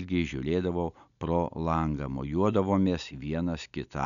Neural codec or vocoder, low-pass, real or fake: none; 7.2 kHz; real